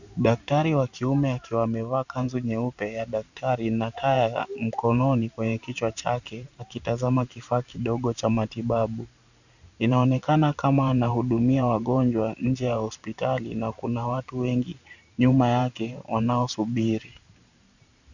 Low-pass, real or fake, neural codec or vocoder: 7.2 kHz; fake; vocoder, 44.1 kHz, 128 mel bands every 512 samples, BigVGAN v2